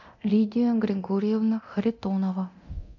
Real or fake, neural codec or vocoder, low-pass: fake; codec, 24 kHz, 0.9 kbps, DualCodec; 7.2 kHz